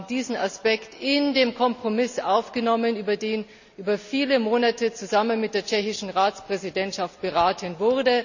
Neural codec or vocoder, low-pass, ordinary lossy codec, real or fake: none; 7.2 kHz; none; real